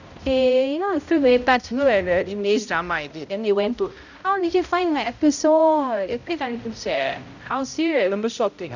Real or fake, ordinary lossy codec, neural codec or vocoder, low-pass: fake; none; codec, 16 kHz, 0.5 kbps, X-Codec, HuBERT features, trained on balanced general audio; 7.2 kHz